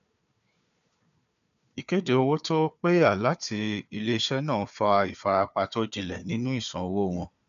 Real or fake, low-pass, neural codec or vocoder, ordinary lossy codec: fake; 7.2 kHz; codec, 16 kHz, 4 kbps, FunCodec, trained on Chinese and English, 50 frames a second; none